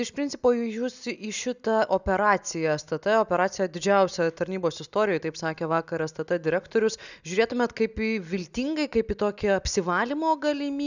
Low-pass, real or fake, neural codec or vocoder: 7.2 kHz; real; none